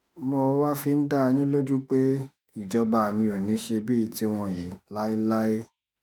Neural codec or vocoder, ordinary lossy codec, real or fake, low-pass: autoencoder, 48 kHz, 32 numbers a frame, DAC-VAE, trained on Japanese speech; none; fake; none